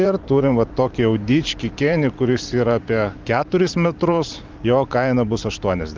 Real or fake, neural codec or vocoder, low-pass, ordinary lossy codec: fake; vocoder, 44.1 kHz, 128 mel bands every 512 samples, BigVGAN v2; 7.2 kHz; Opus, 32 kbps